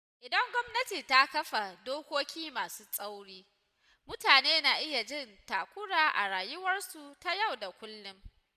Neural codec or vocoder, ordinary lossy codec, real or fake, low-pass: none; none; real; 14.4 kHz